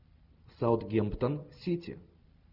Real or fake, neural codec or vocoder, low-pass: real; none; 5.4 kHz